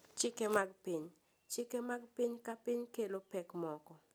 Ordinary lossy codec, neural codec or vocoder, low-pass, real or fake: none; none; none; real